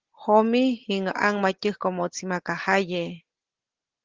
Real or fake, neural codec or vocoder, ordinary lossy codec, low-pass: real; none; Opus, 16 kbps; 7.2 kHz